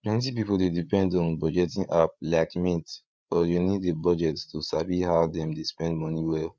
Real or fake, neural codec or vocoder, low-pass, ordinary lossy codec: fake; codec, 16 kHz, 8 kbps, FreqCodec, larger model; none; none